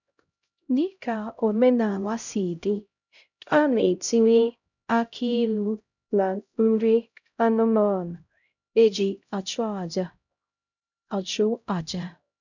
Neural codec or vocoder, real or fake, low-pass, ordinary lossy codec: codec, 16 kHz, 0.5 kbps, X-Codec, HuBERT features, trained on LibriSpeech; fake; 7.2 kHz; none